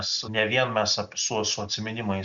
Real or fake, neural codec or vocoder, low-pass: real; none; 7.2 kHz